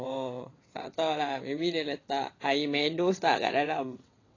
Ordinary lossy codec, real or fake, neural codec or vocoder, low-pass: AAC, 32 kbps; fake; vocoder, 22.05 kHz, 80 mel bands, WaveNeXt; 7.2 kHz